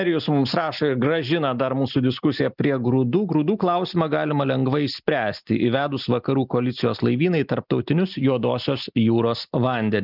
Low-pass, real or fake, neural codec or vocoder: 5.4 kHz; real; none